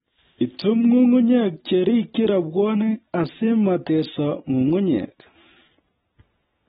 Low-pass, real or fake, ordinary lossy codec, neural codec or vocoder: 19.8 kHz; real; AAC, 16 kbps; none